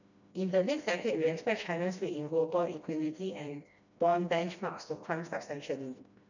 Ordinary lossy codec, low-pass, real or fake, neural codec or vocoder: none; 7.2 kHz; fake; codec, 16 kHz, 1 kbps, FreqCodec, smaller model